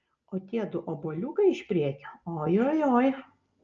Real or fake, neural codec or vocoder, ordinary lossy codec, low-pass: real; none; Opus, 24 kbps; 7.2 kHz